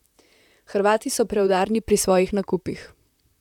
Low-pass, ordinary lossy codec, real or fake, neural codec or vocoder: 19.8 kHz; none; fake; vocoder, 44.1 kHz, 128 mel bands, Pupu-Vocoder